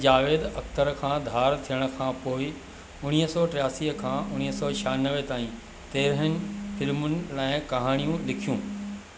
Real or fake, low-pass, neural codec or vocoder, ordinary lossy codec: real; none; none; none